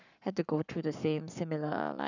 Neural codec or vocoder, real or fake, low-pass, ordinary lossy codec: codec, 44.1 kHz, 7.8 kbps, Pupu-Codec; fake; 7.2 kHz; none